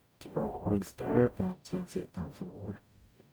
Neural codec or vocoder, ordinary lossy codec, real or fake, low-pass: codec, 44.1 kHz, 0.9 kbps, DAC; none; fake; none